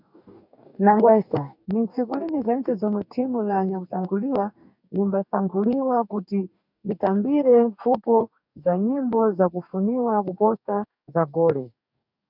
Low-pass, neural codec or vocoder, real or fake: 5.4 kHz; codec, 44.1 kHz, 2.6 kbps, DAC; fake